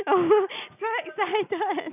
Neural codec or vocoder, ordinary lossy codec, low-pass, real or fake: none; none; 3.6 kHz; real